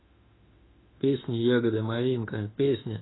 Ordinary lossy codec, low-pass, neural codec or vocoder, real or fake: AAC, 16 kbps; 7.2 kHz; autoencoder, 48 kHz, 32 numbers a frame, DAC-VAE, trained on Japanese speech; fake